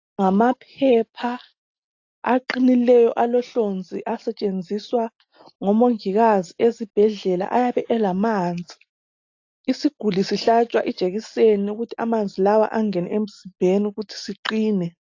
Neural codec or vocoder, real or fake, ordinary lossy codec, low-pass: none; real; AAC, 48 kbps; 7.2 kHz